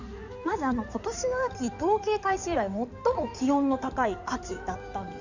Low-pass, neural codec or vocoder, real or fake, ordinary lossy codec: 7.2 kHz; codec, 16 kHz in and 24 kHz out, 2.2 kbps, FireRedTTS-2 codec; fake; none